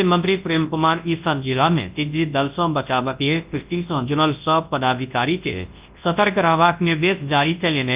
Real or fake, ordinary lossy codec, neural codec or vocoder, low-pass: fake; Opus, 32 kbps; codec, 24 kHz, 0.9 kbps, WavTokenizer, large speech release; 3.6 kHz